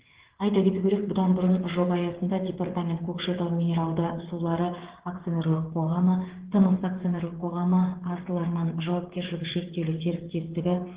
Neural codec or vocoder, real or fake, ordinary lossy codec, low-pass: codec, 16 kHz, 8 kbps, FreqCodec, smaller model; fake; Opus, 16 kbps; 3.6 kHz